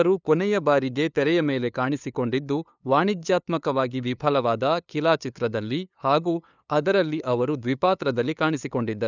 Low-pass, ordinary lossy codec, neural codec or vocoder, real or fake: 7.2 kHz; none; codec, 16 kHz, 2 kbps, FunCodec, trained on LibriTTS, 25 frames a second; fake